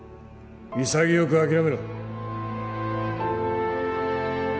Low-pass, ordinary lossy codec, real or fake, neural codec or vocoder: none; none; real; none